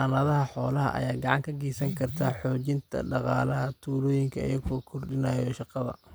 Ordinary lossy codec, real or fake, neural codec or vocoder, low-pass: none; real; none; none